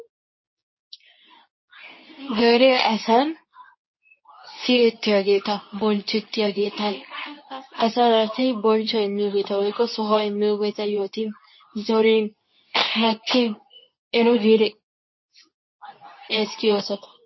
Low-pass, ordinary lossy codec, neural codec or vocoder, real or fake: 7.2 kHz; MP3, 24 kbps; codec, 24 kHz, 0.9 kbps, WavTokenizer, medium speech release version 2; fake